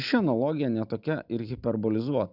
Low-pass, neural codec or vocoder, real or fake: 5.4 kHz; none; real